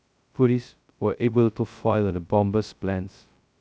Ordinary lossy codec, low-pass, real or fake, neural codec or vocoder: none; none; fake; codec, 16 kHz, 0.2 kbps, FocalCodec